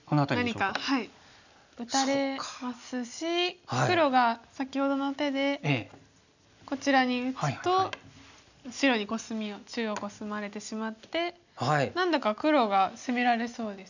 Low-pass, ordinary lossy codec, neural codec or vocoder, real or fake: 7.2 kHz; none; none; real